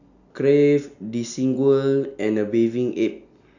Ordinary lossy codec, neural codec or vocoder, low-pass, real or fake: none; none; 7.2 kHz; real